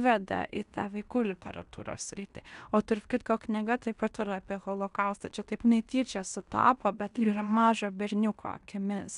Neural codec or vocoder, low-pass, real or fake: codec, 16 kHz in and 24 kHz out, 0.9 kbps, LongCat-Audio-Codec, fine tuned four codebook decoder; 10.8 kHz; fake